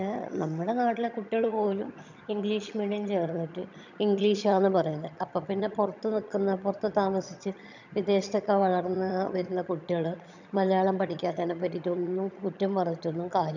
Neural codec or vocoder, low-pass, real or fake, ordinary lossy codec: vocoder, 22.05 kHz, 80 mel bands, HiFi-GAN; 7.2 kHz; fake; none